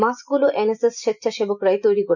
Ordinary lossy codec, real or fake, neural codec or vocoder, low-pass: none; real; none; 7.2 kHz